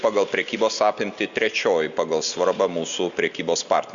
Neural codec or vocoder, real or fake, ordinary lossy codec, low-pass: none; real; Opus, 64 kbps; 7.2 kHz